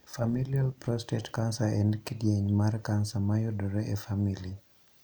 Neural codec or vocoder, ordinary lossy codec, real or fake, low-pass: none; none; real; none